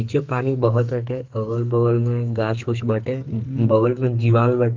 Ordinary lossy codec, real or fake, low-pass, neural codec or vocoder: Opus, 24 kbps; fake; 7.2 kHz; codec, 44.1 kHz, 2.6 kbps, SNAC